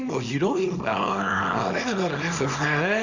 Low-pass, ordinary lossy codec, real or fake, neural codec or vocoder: 7.2 kHz; Opus, 64 kbps; fake; codec, 24 kHz, 0.9 kbps, WavTokenizer, small release